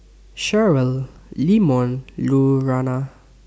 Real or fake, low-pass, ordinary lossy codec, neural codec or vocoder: real; none; none; none